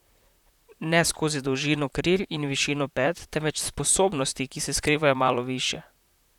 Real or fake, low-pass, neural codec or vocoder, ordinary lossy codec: fake; 19.8 kHz; vocoder, 44.1 kHz, 128 mel bands, Pupu-Vocoder; none